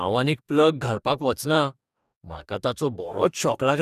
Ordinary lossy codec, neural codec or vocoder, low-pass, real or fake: none; codec, 44.1 kHz, 2.6 kbps, DAC; 14.4 kHz; fake